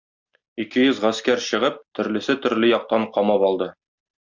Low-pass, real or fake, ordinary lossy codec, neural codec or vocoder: 7.2 kHz; real; Opus, 64 kbps; none